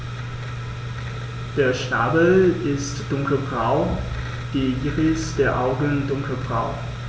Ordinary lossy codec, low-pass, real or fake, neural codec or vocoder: none; none; real; none